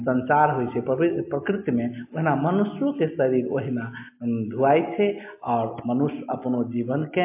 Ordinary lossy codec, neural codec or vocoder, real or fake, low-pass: MP3, 24 kbps; none; real; 3.6 kHz